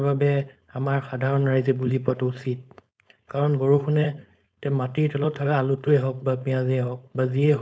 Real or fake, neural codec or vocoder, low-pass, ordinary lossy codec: fake; codec, 16 kHz, 4.8 kbps, FACodec; none; none